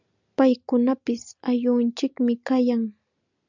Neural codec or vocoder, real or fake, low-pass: none; real; 7.2 kHz